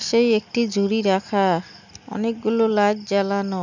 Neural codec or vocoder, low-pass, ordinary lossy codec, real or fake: none; 7.2 kHz; none; real